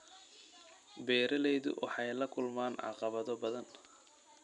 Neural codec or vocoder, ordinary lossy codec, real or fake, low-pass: none; none; real; 10.8 kHz